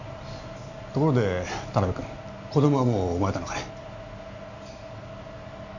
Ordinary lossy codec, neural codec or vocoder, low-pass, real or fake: none; none; 7.2 kHz; real